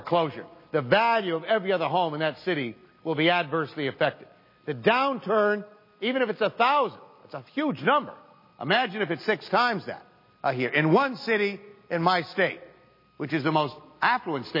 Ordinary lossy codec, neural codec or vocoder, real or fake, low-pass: MP3, 24 kbps; none; real; 5.4 kHz